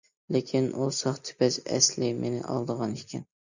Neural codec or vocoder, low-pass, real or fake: none; 7.2 kHz; real